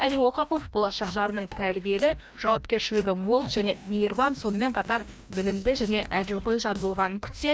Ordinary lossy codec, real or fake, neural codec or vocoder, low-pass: none; fake; codec, 16 kHz, 1 kbps, FreqCodec, larger model; none